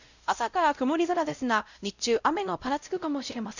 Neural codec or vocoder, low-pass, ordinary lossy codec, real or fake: codec, 16 kHz, 0.5 kbps, X-Codec, WavLM features, trained on Multilingual LibriSpeech; 7.2 kHz; none; fake